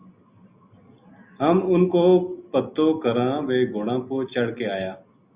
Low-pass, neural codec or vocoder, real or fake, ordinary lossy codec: 3.6 kHz; none; real; Opus, 64 kbps